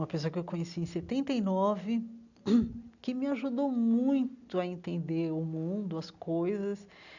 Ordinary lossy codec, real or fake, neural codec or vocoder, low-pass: none; real; none; 7.2 kHz